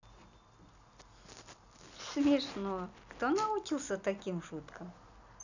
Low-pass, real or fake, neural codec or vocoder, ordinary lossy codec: 7.2 kHz; real; none; none